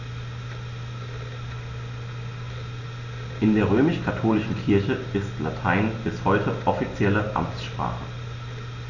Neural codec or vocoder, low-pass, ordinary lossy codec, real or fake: none; 7.2 kHz; none; real